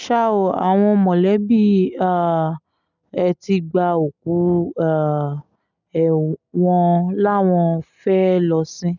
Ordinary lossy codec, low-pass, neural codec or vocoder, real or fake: none; 7.2 kHz; none; real